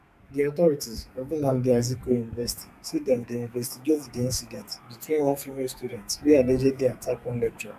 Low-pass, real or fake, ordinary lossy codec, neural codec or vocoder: 14.4 kHz; fake; none; codec, 32 kHz, 1.9 kbps, SNAC